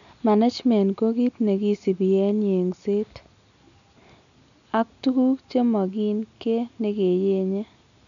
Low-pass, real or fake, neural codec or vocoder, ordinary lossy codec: 7.2 kHz; real; none; none